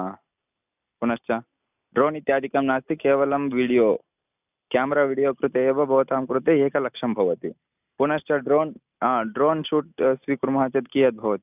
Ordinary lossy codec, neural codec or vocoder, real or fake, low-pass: none; none; real; 3.6 kHz